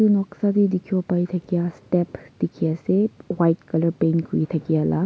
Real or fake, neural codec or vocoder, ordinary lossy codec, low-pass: real; none; none; none